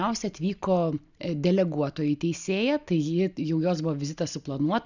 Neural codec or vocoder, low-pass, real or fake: none; 7.2 kHz; real